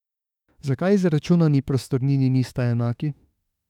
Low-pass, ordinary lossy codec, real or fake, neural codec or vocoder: 19.8 kHz; none; fake; autoencoder, 48 kHz, 32 numbers a frame, DAC-VAE, trained on Japanese speech